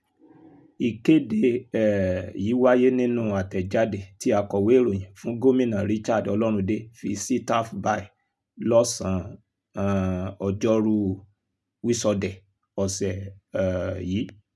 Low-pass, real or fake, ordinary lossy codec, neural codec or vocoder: none; real; none; none